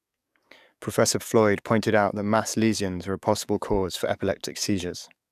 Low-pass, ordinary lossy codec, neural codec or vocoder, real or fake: 14.4 kHz; none; codec, 44.1 kHz, 7.8 kbps, DAC; fake